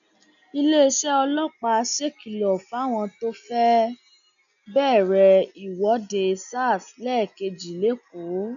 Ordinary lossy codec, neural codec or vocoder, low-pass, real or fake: none; none; 7.2 kHz; real